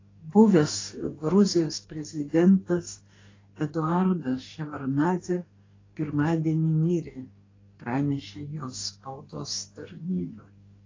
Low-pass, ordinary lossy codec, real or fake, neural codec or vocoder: 7.2 kHz; AAC, 32 kbps; fake; codec, 44.1 kHz, 2.6 kbps, DAC